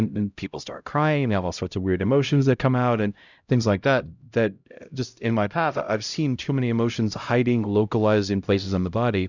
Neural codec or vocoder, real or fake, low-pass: codec, 16 kHz, 0.5 kbps, X-Codec, HuBERT features, trained on LibriSpeech; fake; 7.2 kHz